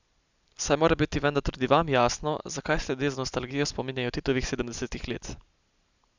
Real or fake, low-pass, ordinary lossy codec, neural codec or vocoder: real; 7.2 kHz; none; none